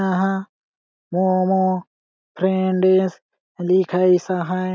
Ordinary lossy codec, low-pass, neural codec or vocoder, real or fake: none; none; none; real